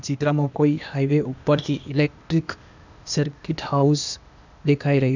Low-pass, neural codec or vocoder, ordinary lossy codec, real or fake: 7.2 kHz; codec, 16 kHz, 0.8 kbps, ZipCodec; none; fake